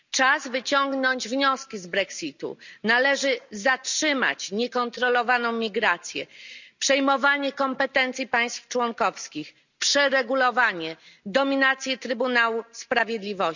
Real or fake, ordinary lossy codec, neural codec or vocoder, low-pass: real; none; none; 7.2 kHz